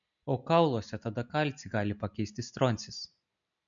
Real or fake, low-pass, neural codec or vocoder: real; 7.2 kHz; none